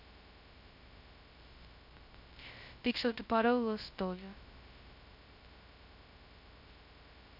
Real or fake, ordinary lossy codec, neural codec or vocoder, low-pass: fake; MP3, 48 kbps; codec, 16 kHz, 0.2 kbps, FocalCodec; 5.4 kHz